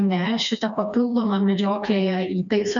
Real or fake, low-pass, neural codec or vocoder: fake; 7.2 kHz; codec, 16 kHz, 2 kbps, FreqCodec, smaller model